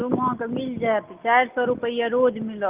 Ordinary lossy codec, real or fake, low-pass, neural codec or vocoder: Opus, 32 kbps; real; 3.6 kHz; none